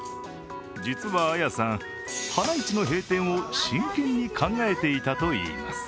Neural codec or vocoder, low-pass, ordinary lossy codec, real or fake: none; none; none; real